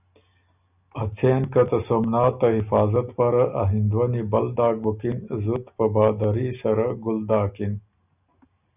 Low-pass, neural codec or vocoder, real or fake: 3.6 kHz; none; real